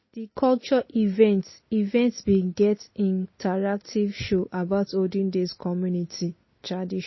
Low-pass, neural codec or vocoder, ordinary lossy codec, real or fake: 7.2 kHz; none; MP3, 24 kbps; real